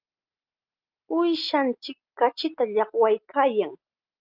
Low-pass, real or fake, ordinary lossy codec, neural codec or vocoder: 5.4 kHz; real; Opus, 24 kbps; none